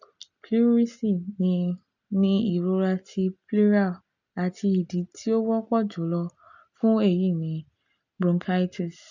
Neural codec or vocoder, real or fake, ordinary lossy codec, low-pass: none; real; none; 7.2 kHz